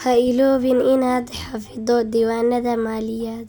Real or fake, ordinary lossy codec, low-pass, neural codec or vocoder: real; none; none; none